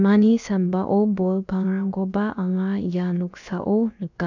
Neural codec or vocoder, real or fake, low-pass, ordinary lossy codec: codec, 16 kHz, about 1 kbps, DyCAST, with the encoder's durations; fake; 7.2 kHz; none